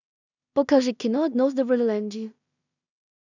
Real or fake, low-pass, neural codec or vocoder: fake; 7.2 kHz; codec, 16 kHz in and 24 kHz out, 0.4 kbps, LongCat-Audio-Codec, two codebook decoder